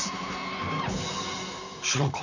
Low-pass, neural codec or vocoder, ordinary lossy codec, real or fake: 7.2 kHz; vocoder, 44.1 kHz, 128 mel bands, Pupu-Vocoder; none; fake